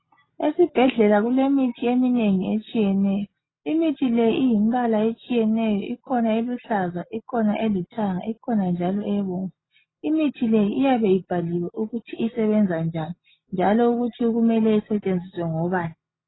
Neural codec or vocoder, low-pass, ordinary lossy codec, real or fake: none; 7.2 kHz; AAC, 16 kbps; real